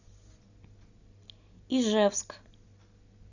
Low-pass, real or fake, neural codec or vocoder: 7.2 kHz; real; none